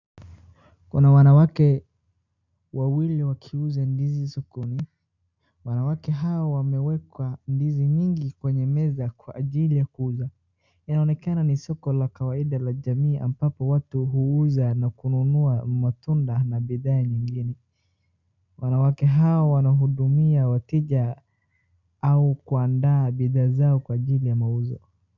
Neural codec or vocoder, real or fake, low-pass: none; real; 7.2 kHz